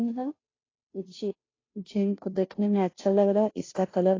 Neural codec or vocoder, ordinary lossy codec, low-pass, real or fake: codec, 16 kHz, 0.5 kbps, FunCodec, trained on Chinese and English, 25 frames a second; AAC, 32 kbps; 7.2 kHz; fake